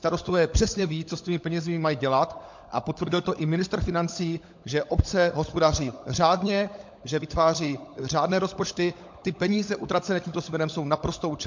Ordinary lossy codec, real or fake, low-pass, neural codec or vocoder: MP3, 48 kbps; fake; 7.2 kHz; codec, 16 kHz, 16 kbps, FunCodec, trained on LibriTTS, 50 frames a second